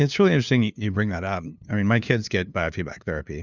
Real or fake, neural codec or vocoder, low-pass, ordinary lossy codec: fake; codec, 16 kHz, 2 kbps, FunCodec, trained on LibriTTS, 25 frames a second; 7.2 kHz; Opus, 64 kbps